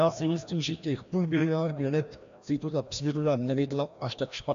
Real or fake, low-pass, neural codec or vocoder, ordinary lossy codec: fake; 7.2 kHz; codec, 16 kHz, 1 kbps, FreqCodec, larger model; AAC, 64 kbps